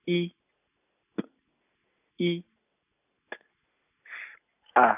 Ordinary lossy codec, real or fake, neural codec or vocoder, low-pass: none; real; none; 3.6 kHz